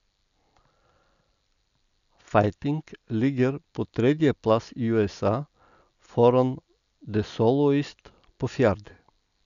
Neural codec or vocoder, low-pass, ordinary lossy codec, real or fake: none; 7.2 kHz; none; real